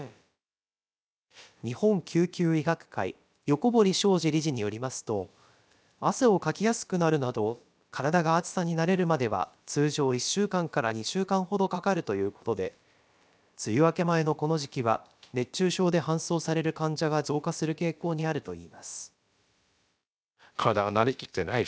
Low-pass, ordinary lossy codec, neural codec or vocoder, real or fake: none; none; codec, 16 kHz, about 1 kbps, DyCAST, with the encoder's durations; fake